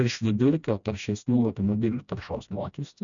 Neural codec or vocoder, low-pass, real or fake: codec, 16 kHz, 1 kbps, FreqCodec, smaller model; 7.2 kHz; fake